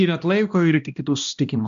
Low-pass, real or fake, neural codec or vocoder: 7.2 kHz; fake; codec, 16 kHz, 1 kbps, X-Codec, HuBERT features, trained on balanced general audio